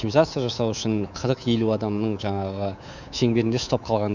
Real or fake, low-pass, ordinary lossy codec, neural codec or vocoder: fake; 7.2 kHz; none; codec, 24 kHz, 3.1 kbps, DualCodec